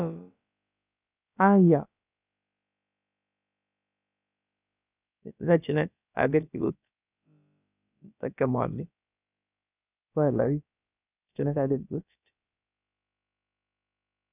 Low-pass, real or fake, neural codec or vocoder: 3.6 kHz; fake; codec, 16 kHz, about 1 kbps, DyCAST, with the encoder's durations